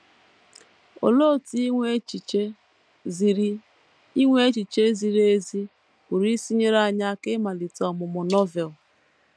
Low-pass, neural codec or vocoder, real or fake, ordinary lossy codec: none; none; real; none